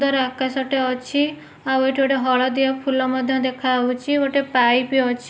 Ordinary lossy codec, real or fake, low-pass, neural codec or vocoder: none; real; none; none